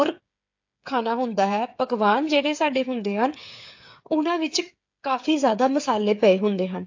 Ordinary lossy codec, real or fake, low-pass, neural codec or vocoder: AAC, 48 kbps; fake; 7.2 kHz; codec, 16 kHz, 8 kbps, FreqCodec, smaller model